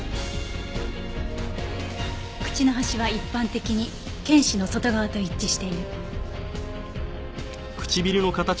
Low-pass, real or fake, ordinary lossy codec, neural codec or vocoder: none; real; none; none